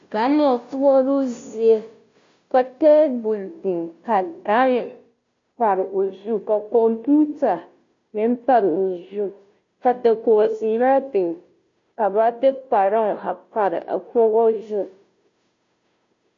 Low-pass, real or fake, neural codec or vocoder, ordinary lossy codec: 7.2 kHz; fake; codec, 16 kHz, 0.5 kbps, FunCodec, trained on Chinese and English, 25 frames a second; MP3, 48 kbps